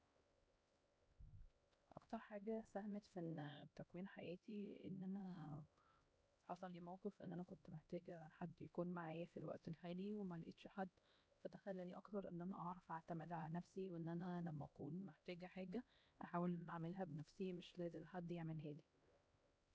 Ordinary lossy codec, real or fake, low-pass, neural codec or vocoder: none; fake; none; codec, 16 kHz, 1 kbps, X-Codec, HuBERT features, trained on LibriSpeech